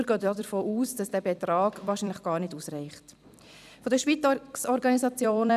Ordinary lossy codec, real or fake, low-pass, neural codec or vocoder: none; fake; 14.4 kHz; vocoder, 44.1 kHz, 128 mel bands every 256 samples, BigVGAN v2